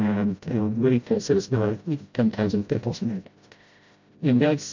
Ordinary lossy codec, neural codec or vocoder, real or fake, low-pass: MP3, 64 kbps; codec, 16 kHz, 0.5 kbps, FreqCodec, smaller model; fake; 7.2 kHz